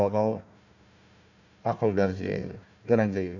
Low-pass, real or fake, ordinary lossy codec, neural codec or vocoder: 7.2 kHz; fake; none; codec, 16 kHz, 1 kbps, FunCodec, trained on Chinese and English, 50 frames a second